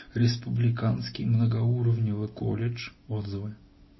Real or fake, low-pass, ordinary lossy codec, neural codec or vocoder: real; 7.2 kHz; MP3, 24 kbps; none